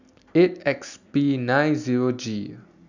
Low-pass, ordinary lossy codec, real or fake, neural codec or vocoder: 7.2 kHz; none; real; none